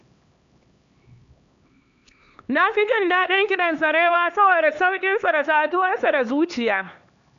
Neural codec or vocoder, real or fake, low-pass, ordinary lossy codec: codec, 16 kHz, 2 kbps, X-Codec, HuBERT features, trained on LibriSpeech; fake; 7.2 kHz; MP3, 64 kbps